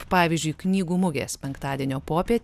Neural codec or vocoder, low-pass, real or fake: none; 14.4 kHz; real